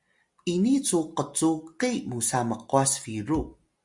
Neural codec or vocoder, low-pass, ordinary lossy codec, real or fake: none; 10.8 kHz; Opus, 64 kbps; real